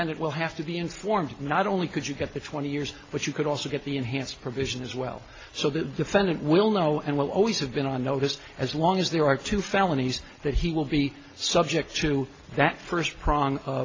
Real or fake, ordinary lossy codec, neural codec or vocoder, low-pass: real; AAC, 32 kbps; none; 7.2 kHz